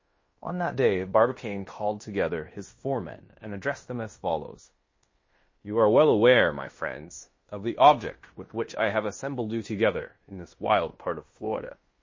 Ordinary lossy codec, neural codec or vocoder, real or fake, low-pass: MP3, 32 kbps; codec, 16 kHz in and 24 kHz out, 0.9 kbps, LongCat-Audio-Codec, fine tuned four codebook decoder; fake; 7.2 kHz